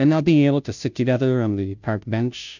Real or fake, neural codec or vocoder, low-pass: fake; codec, 16 kHz, 0.5 kbps, FunCodec, trained on Chinese and English, 25 frames a second; 7.2 kHz